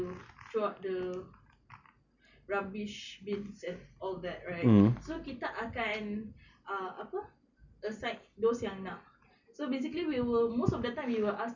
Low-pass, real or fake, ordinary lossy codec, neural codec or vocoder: 7.2 kHz; real; none; none